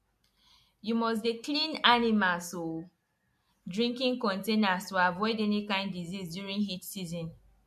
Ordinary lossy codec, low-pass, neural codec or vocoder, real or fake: MP3, 64 kbps; 14.4 kHz; none; real